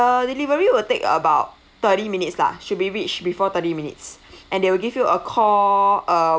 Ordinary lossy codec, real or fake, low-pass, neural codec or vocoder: none; real; none; none